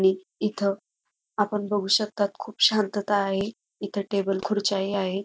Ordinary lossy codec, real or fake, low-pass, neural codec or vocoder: none; real; none; none